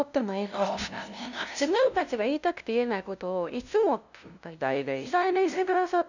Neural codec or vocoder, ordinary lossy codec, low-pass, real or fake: codec, 16 kHz, 0.5 kbps, FunCodec, trained on LibriTTS, 25 frames a second; none; 7.2 kHz; fake